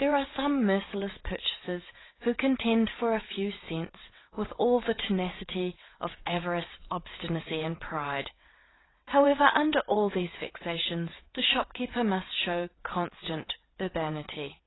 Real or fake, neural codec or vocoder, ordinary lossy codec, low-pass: fake; vocoder, 22.05 kHz, 80 mel bands, WaveNeXt; AAC, 16 kbps; 7.2 kHz